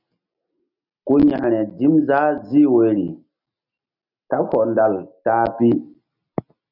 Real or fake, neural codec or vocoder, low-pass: real; none; 5.4 kHz